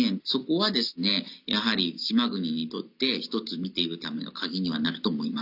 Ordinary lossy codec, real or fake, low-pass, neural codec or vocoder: none; real; 5.4 kHz; none